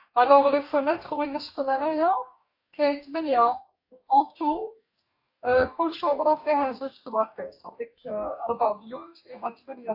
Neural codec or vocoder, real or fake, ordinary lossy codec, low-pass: codec, 44.1 kHz, 2.6 kbps, DAC; fake; AAC, 48 kbps; 5.4 kHz